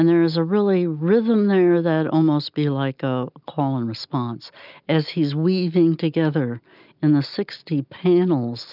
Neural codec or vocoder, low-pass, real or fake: none; 5.4 kHz; real